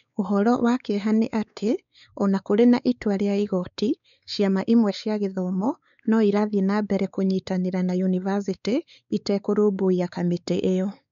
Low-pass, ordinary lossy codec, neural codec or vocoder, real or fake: 7.2 kHz; none; codec, 16 kHz, 4 kbps, X-Codec, WavLM features, trained on Multilingual LibriSpeech; fake